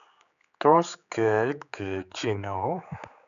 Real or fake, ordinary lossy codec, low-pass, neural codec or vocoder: fake; AAC, 96 kbps; 7.2 kHz; codec, 16 kHz, 4 kbps, X-Codec, HuBERT features, trained on general audio